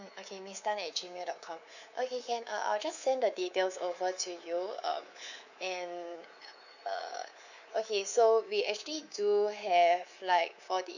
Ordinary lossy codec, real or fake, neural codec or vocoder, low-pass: none; fake; codec, 24 kHz, 3.1 kbps, DualCodec; 7.2 kHz